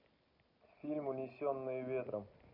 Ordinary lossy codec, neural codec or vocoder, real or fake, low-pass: none; none; real; 5.4 kHz